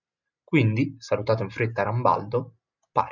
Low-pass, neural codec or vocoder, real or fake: 7.2 kHz; none; real